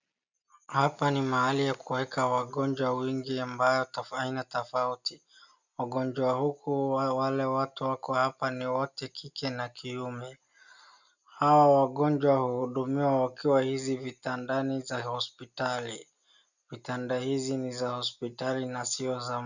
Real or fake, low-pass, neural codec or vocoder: real; 7.2 kHz; none